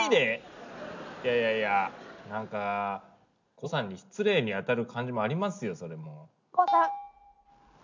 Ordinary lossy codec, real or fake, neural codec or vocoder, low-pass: none; real; none; 7.2 kHz